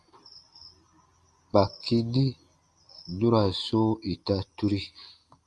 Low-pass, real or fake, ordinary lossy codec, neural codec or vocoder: 10.8 kHz; real; Opus, 32 kbps; none